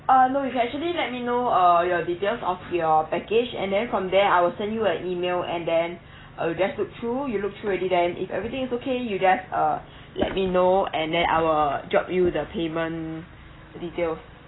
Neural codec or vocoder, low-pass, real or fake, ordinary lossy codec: none; 7.2 kHz; real; AAC, 16 kbps